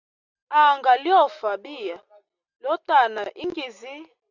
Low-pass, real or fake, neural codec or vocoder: 7.2 kHz; real; none